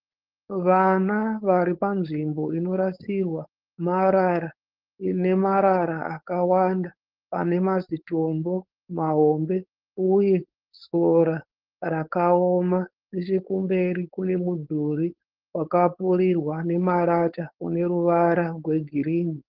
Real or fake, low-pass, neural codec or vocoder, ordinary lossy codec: fake; 5.4 kHz; codec, 16 kHz, 4.8 kbps, FACodec; Opus, 16 kbps